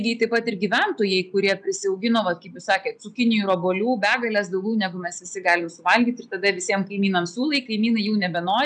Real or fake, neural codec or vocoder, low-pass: real; none; 9.9 kHz